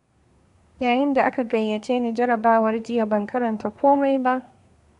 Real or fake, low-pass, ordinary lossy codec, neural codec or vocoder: fake; 10.8 kHz; none; codec, 24 kHz, 1 kbps, SNAC